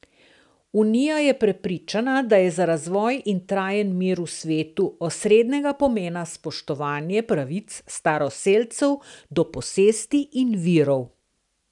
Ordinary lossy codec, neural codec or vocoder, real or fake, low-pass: none; none; real; 10.8 kHz